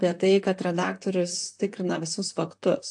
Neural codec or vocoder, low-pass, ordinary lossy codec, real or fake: vocoder, 44.1 kHz, 128 mel bands, Pupu-Vocoder; 10.8 kHz; AAC, 64 kbps; fake